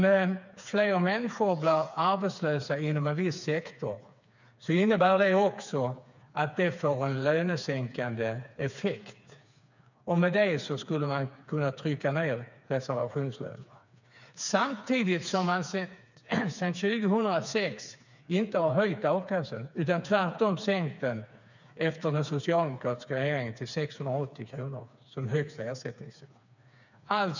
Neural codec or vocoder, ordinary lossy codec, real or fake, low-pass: codec, 16 kHz, 4 kbps, FreqCodec, smaller model; none; fake; 7.2 kHz